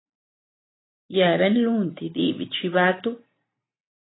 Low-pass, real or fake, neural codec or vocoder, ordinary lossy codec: 7.2 kHz; real; none; AAC, 16 kbps